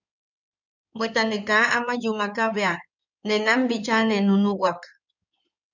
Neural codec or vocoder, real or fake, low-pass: codec, 16 kHz in and 24 kHz out, 2.2 kbps, FireRedTTS-2 codec; fake; 7.2 kHz